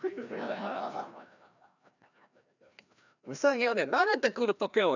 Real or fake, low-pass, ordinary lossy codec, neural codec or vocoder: fake; 7.2 kHz; none; codec, 16 kHz, 1 kbps, FreqCodec, larger model